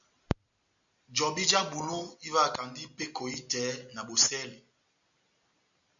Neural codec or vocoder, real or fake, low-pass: none; real; 7.2 kHz